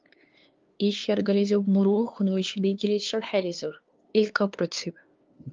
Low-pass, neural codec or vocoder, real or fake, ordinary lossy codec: 7.2 kHz; codec, 16 kHz, 2 kbps, FunCodec, trained on LibriTTS, 25 frames a second; fake; Opus, 32 kbps